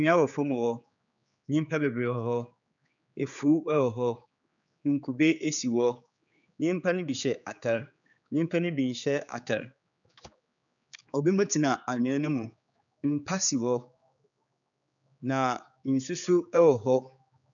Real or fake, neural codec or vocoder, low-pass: fake; codec, 16 kHz, 4 kbps, X-Codec, HuBERT features, trained on general audio; 7.2 kHz